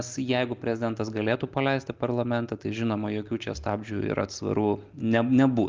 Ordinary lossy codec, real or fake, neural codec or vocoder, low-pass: Opus, 24 kbps; real; none; 7.2 kHz